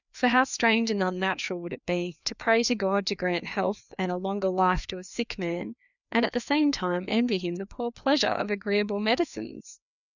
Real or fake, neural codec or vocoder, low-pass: fake; codec, 16 kHz, 2 kbps, FreqCodec, larger model; 7.2 kHz